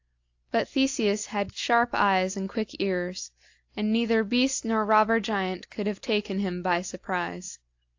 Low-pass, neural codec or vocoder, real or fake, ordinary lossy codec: 7.2 kHz; none; real; AAC, 48 kbps